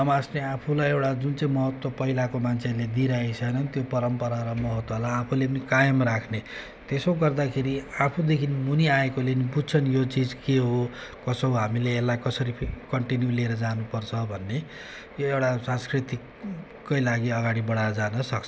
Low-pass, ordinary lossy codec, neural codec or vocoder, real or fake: none; none; none; real